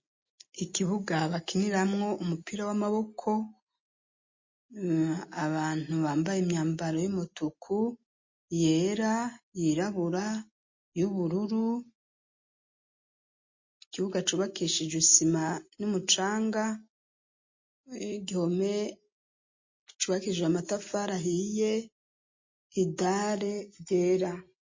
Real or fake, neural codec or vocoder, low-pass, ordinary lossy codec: real; none; 7.2 kHz; MP3, 32 kbps